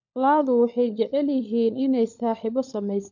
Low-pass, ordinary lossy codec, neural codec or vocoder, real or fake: 7.2 kHz; none; codec, 16 kHz, 16 kbps, FunCodec, trained on LibriTTS, 50 frames a second; fake